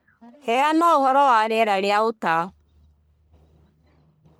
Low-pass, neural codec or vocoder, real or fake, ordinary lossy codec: none; codec, 44.1 kHz, 1.7 kbps, Pupu-Codec; fake; none